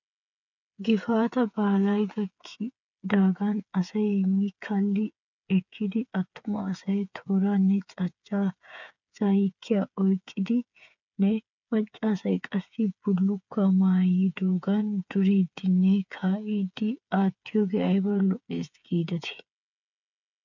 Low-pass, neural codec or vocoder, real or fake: 7.2 kHz; codec, 16 kHz, 8 kbps, FreqCodec, smaller model; fake